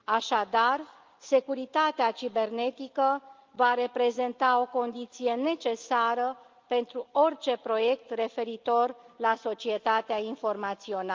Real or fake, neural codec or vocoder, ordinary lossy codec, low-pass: real; none; Opus, 24 kbps; 7.2 kHz